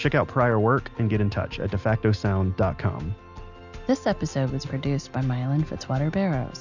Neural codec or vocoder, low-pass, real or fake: none; 7.2 kHz; real